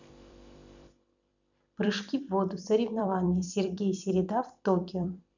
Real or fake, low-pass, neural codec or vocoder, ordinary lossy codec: fake; 7.2 kHz; vocoder, 44.1 kHz, 128 mel bands every 256 samples, BigVGAN v2; none